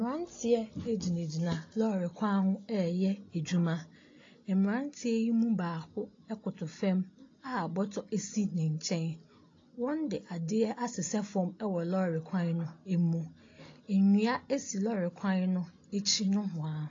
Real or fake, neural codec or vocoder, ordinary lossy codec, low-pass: real; none; AAC, 32 kbps; 7.2 kHz